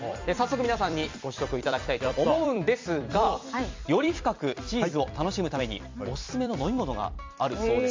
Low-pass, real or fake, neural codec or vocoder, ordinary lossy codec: 7.2 kHz; fake; vocoder, 44.1 kHz, 128 mel bands every 256 samples, BigVGAN v2; MP3, 64 kbps